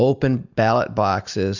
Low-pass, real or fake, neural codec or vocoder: 7.2 kHz; real; none